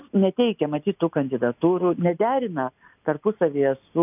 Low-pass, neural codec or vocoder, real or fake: 3.6 kHz; none; real